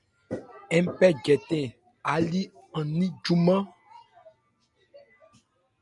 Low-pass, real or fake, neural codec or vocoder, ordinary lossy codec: 10.8 kHz; real; none; MP3, 96 kbps